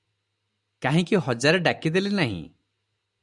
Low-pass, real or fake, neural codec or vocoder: 10.8 kHz; real; none